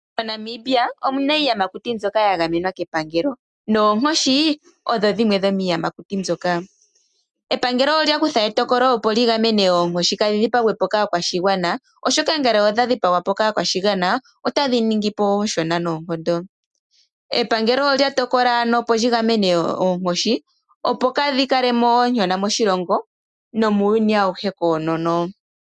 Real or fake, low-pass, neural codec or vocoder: real; 10.8 kHz; none